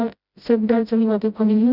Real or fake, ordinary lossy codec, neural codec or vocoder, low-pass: fake; none; codec, 16 kHz, 0.5 kbps, FreqCodec, smaller model; 5.4 kHz